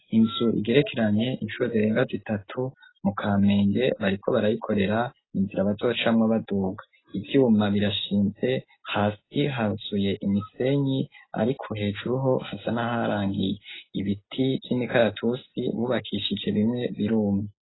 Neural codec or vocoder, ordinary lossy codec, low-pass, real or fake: none; AAC, 16 kbps; 7.2 kHz; real